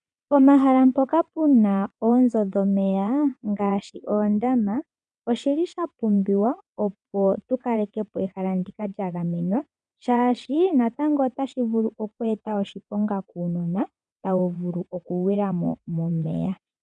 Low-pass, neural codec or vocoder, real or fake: 9.9 kHz; vocoder, 22.05 kHz, 80 mel bands, WaveNeXt; fake